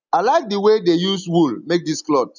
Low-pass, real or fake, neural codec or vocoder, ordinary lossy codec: 7.2 kHz; real; none; none